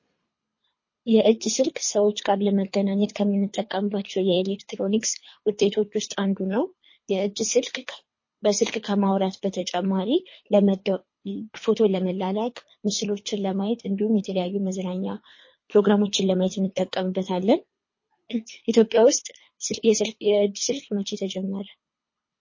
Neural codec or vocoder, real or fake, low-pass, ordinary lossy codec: codec, 24 kHz, 3 kbps, HILCodec; fake; 7.2 kHz; MP3, 32 kbps